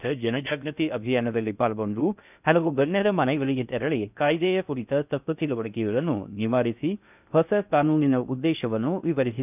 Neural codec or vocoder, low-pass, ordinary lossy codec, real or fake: codec, 16 kHz in and 24 kHz out, 0.6 kbps, FocalCodec, streaming, 2048 codes; 3.6 kHz; none; fake